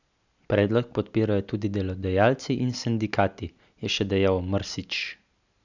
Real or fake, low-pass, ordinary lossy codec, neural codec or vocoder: real; 7.2 kHz; none; none